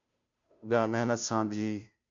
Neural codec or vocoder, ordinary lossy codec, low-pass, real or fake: codec, 16 kHz, 0.5 kbps, FunCodec, trained on Chinese and English, 25 frames a second; MP3, 48 kbps; 7.2 kHz; fake